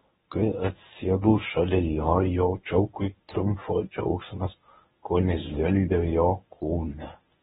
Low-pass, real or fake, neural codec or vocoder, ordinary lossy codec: 10.8 kHz; fake; codec, 24 kHz, 0.9 kbps, WavTokenizer, medium speech release version 1; AAC, 16 kbps